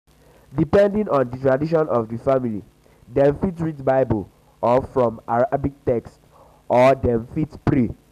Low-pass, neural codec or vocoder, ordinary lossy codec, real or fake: 14.4 kHz; none; none; real